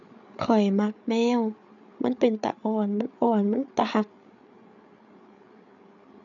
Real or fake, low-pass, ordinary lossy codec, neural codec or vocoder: fake; 7.2 kHz; none; codec, 16 kHz, 8 kbps, FreqCodec, larger model